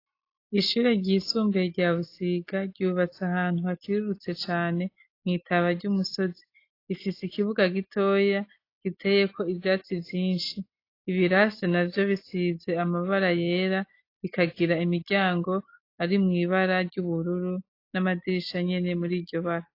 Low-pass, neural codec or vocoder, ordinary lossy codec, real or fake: 5.4 kHz; none; AAC, 32 kbps; real